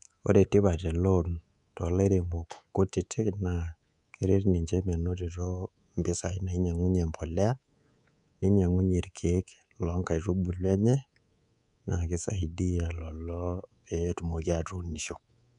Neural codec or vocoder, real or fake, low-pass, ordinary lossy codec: codec, 24 kHz, 3.1 kbps, DualCodec; fake; 10.8 kHz; none